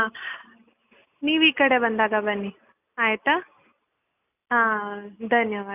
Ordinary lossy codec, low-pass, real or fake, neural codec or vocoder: none; 3.6 kHz; real; none